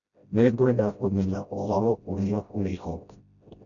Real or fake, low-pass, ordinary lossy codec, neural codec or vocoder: fake; 7.2 kHz; none; codec, 16 kHz, 0.5 kbps, FreqCodec, smaller model